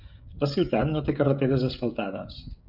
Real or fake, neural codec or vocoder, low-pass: fake; codec, 16 kHz, 16 kbps, FreqCodec, smaller model; 5.4 kHz